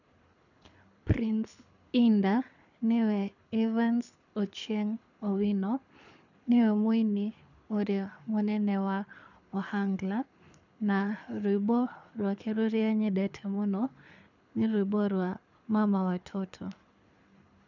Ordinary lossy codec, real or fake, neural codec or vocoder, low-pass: none; fake; codec, 24 kHz, 6 kbps, HILCodec; 7.2 kHz